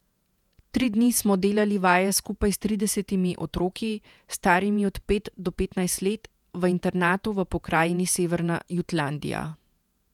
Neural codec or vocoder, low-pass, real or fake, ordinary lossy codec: vocoder, 48 kHz, 128 mel bands, Vocos; 19.8 kHz; fake; none